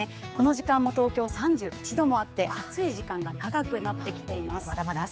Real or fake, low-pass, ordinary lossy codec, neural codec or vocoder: fake; none; none; codec, 16 kHz, 4 kbps, X-Codec, HuBERT features, trained on general audio